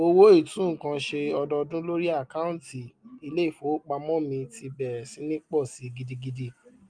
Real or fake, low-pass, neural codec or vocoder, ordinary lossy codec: real; 9.9 kHz; none; Opus, 32 kbps